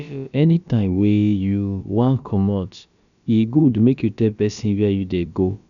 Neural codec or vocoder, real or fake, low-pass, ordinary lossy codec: codec, 16 kHz, about 1 kbps, DyCAST, with the encoder's durations; fake; 7.2 kHz; none